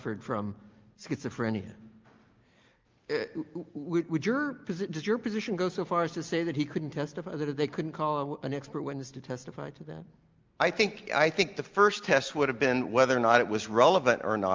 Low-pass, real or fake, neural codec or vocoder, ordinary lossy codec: 7.2 kHz; real; none; Opus, 24 kbps